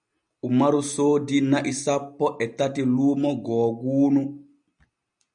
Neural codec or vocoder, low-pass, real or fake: none; 9.9 kHz; real